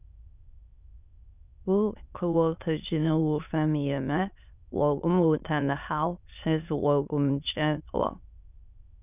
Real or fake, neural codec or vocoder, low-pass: fake; autoencoder, 22.05 kHz, a latent of 192 numbers a frame, VITS, trained on many speakers; 3.6 kHz